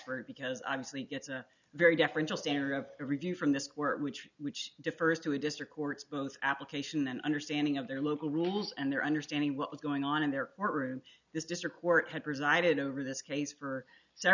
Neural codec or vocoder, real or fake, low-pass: none; real; 7.2 kHz